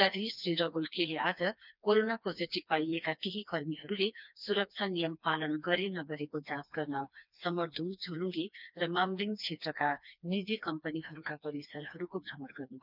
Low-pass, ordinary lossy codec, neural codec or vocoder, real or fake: 5.4 kHz; none; codec, 16 kHz, 2 kbps, FreqCodec, smaller model; fake